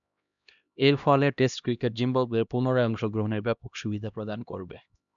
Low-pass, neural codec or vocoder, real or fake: 7.2 kHz; codec, 16 kHz, 1 kbps, X-Codec, HuBERT features, trained on LibriSpeech; fake